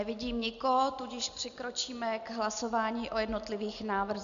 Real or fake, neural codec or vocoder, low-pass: real; none; 7.2 kHz